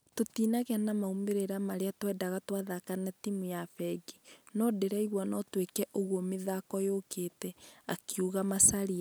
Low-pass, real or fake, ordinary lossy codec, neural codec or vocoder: none; real; none; none